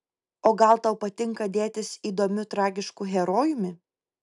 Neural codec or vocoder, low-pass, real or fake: none; 10.8 kHz; real